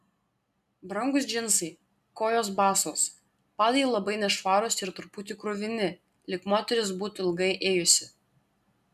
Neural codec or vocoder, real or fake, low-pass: none; real; 14.4 kHz